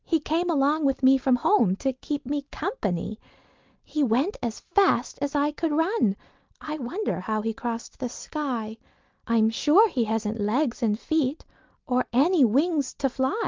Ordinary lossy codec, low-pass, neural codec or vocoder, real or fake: Opus, 32 kbps; 7.2 kHz; none; real